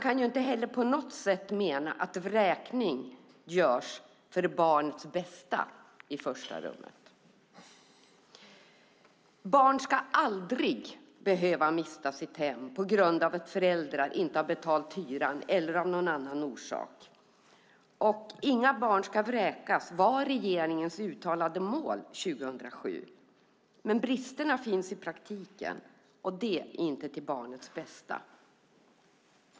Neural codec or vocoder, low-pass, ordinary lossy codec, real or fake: none; none; none; real